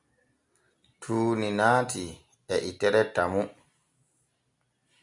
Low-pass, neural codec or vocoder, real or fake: 10.8 kHz; none; real